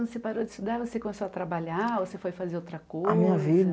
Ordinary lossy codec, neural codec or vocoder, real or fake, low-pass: none; none; real; none